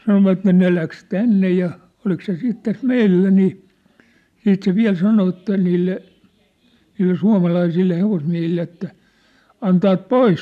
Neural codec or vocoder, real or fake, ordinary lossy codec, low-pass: none; real; none; 14.4 kHz